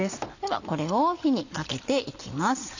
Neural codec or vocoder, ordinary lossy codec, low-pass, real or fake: none; none; 7.2 kHz; real